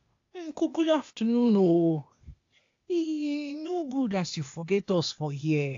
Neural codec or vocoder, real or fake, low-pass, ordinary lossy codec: codec, 16 kHz, 0.8 kbps, ZipCodec; fake; 7.2 kHz; AAC, 48 kbps